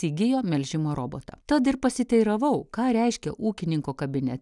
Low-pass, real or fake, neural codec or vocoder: 10.8 kHz; real; none